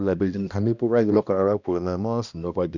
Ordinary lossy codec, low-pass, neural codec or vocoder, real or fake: none; 7.2 kHz; codec, 16 kHz, 1 kbps, X-Codec, HuBERT features, trained on balanced general audio; fake